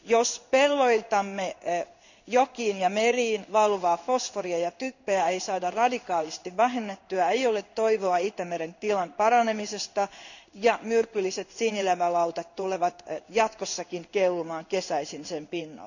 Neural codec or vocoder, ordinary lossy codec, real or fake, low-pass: codec, 16 kHz in and 24 kHz out, 1 kbps, XY-Tokenizer; none; fake; 7.2 kHz